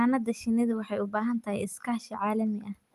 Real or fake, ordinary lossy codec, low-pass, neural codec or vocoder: real; Opus, 32 kbps; 14.4 kHz; none